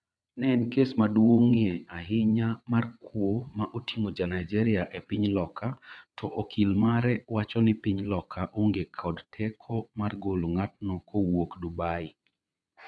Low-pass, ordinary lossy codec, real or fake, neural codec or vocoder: none; none; fake; vocoder, 22.05 kHz, 80 mel bands, WaveNeXt